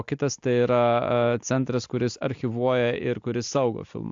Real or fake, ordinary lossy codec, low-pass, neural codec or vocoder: real; AAC, 64 kbps; 7.2 kHz; none